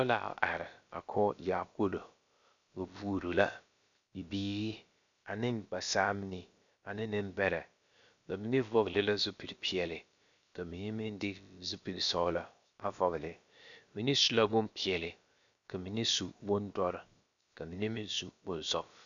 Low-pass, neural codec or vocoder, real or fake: 7.2 kHz; codec, 16 kHz, about 1 kbps, DyCAST, with the encoder's durations; fake